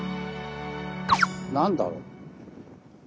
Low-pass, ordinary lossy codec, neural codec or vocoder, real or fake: none; none; none; real